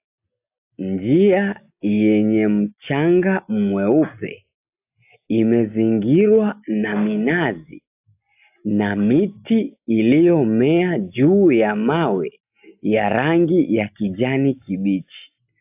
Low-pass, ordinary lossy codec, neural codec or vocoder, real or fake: 3.6 kHz; AAC, 32 kbps; none; real